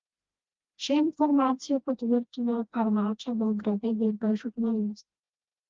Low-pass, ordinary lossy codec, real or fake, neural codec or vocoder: 7.2 kHz; Opus, 32 kbps; fake; codec, 16 kHz, 1 kbps, FreqCodec, smaller model